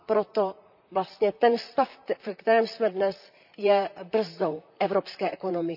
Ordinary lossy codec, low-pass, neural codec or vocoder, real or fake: none; 5.4 kHz; vocoder, 44.1 kHz, 128 mel bands, Pupu-Vocoder; fake